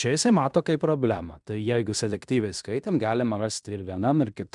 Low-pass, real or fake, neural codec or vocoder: 10.8 kHz; fake; codec, 16 kHz in and 24 kHz out, 0.9 kbps, LongCat-Audio-Codec, fine tuned four codebook decoder